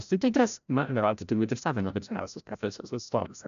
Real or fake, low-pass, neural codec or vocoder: fake; 7.2 kHz; codec, 16 kHz, 0.5 kbps, FreqCodec, larger model